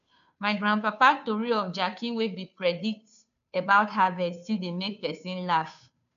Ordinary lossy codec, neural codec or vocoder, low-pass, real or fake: AAC, 96 kbps; codec, 16 kHz, 2 kbps, FunCodec, trained on Chinese and English, 25 frames a second; 7.2 kHz; fake